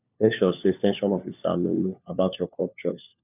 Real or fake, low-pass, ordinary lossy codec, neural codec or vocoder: fake; 3.6 kHz; none; codec, 16 kHz, 4 kbps, FunCodec, trained on LibriTTS, 50 frames a second